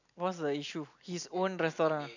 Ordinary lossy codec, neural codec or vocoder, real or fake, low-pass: none; none; real; 7.2 kHz